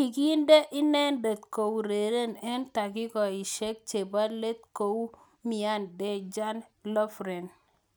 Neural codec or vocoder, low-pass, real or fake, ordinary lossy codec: none; none; real; none